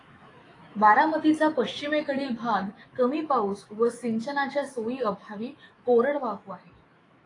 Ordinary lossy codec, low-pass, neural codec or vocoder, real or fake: AAC, 32 kbps; 10.8 kHz; autoencoder, 48 kHz, 128 numbers a frame, DAC-VAE, trained on Japanese speech; fake